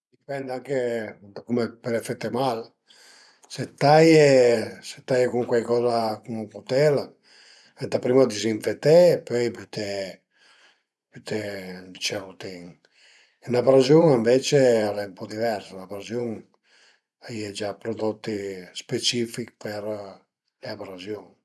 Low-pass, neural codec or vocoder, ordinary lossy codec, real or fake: none; vocoder, 24 kHz, 100 mel bands, Vocos; none; fake